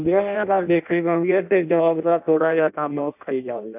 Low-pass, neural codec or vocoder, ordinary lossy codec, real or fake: 3.6 kHz; codec, 16 kHz in and 24 kHz out, 0.6 kbps, FireRedTTS-2 codec; none; fake